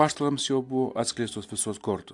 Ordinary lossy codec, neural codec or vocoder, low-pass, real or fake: AAC, 64 kbps; none; 10.8 kHz; real